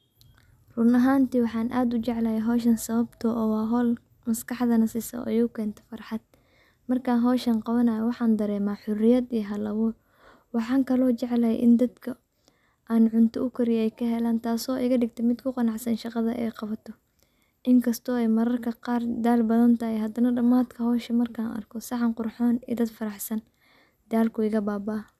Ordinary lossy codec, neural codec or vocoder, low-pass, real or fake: none; none; 14.4 kHz; real